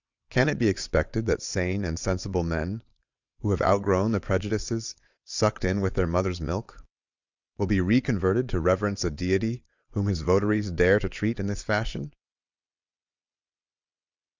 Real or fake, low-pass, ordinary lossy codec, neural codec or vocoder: fake; 7.2 kHz; Opus, 64 kbps; vocoder, 22.05 kHz, 80 mel bands, WaveNeXt